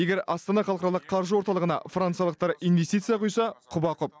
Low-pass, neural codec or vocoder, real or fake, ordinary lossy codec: none; none; real; none